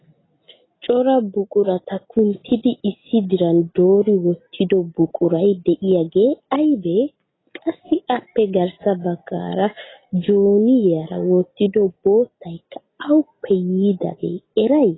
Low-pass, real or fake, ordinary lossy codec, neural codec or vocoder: 7.2 kHz; real; AAC, 16 kbps; none